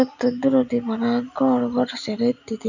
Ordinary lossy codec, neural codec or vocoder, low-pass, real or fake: none; none; 7.2 kHz; real